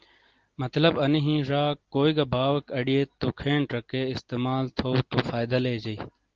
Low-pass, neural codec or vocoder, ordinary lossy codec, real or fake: 7.2 kHz; none; Opus, 16 kbps; real